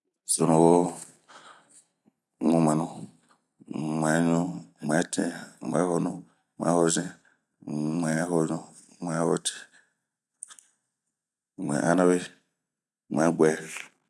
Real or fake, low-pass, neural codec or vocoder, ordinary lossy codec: real; none; none; none